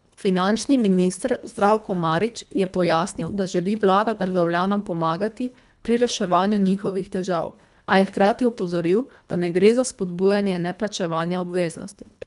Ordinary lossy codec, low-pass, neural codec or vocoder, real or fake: none; 10.8 kHz; codec, 24 kHz, 1.5 kbps, HILCodec; fake